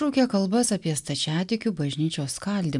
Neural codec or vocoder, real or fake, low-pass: none; real; 10.8 kHz